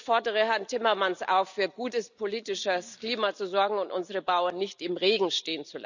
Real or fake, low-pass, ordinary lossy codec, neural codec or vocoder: real; 7.2 kHz; none; none